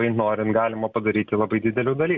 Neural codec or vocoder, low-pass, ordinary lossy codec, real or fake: none; 7.2 kHz; Opus, 64 kbps; real